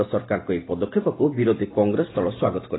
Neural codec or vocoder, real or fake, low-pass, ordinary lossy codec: none; real; 7.2 kHz; AAC, 16 kbps